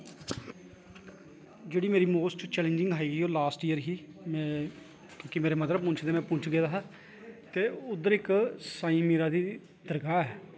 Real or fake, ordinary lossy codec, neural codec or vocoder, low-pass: real; none; none; none